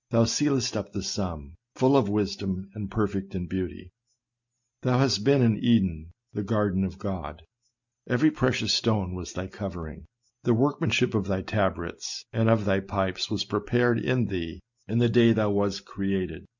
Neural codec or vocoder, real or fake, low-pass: none; real; 7.2 kHz